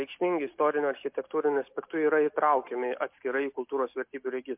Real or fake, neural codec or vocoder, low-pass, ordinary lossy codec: real; none; 3.6 kHz; MP3, 32 kbps